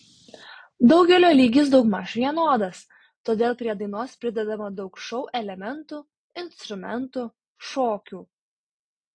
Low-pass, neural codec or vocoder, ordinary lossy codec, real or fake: 9.9 kHz; none; AAC, 48 kbps; real